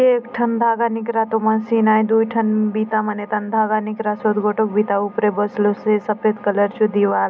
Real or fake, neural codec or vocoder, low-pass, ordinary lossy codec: real; none; none; none